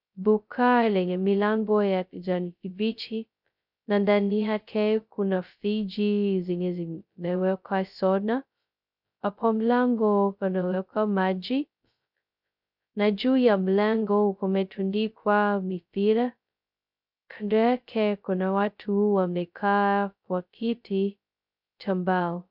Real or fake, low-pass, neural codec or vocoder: fake; 5.4 kHz; codec, 16 kHz, 0.2 kbps, FocalCodec